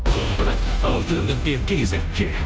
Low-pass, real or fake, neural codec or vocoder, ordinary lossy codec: none; fake; codec, 16 kHz, 0.5 kbps, FunCodec, trained on Chinese and English, 25 frames a second; none